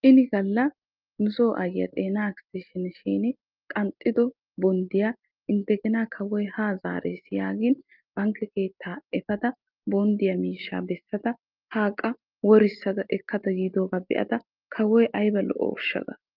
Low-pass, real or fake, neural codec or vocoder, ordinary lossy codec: 5.4 kHz; real; none; Opus, 24 kbps